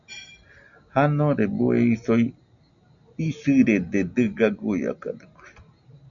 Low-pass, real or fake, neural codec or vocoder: 7.2 kHz; real; none